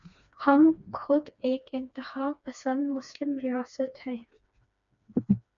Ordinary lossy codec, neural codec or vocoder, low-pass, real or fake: MP3, 64 kbps; codec, 16 kHz, 2 kbps, FreqCodec, smaller model; 7.2 kHz; fake